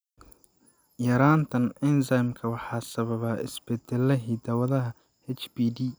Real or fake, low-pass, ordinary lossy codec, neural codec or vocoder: real; none; none; none